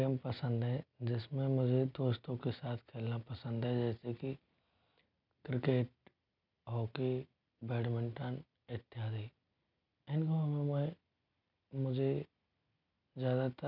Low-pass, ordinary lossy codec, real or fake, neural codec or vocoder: 5.4 kHz; none; real; none